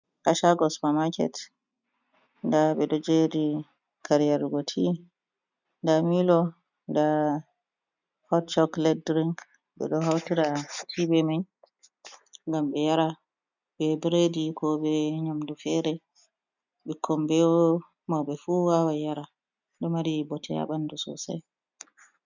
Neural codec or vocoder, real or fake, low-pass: none; real; 7.2 kHz